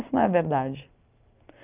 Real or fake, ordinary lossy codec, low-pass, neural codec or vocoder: real; Opus, 24 kbps; 3.6 kHz; none